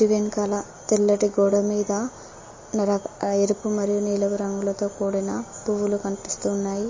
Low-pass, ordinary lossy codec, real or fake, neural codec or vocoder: 7.2 kHz; MP3, 32 kbps; real; none